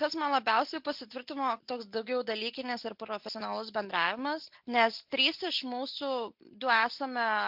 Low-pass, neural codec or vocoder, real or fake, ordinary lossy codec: 5.4 kHz; none; real; MP3, 48 kbps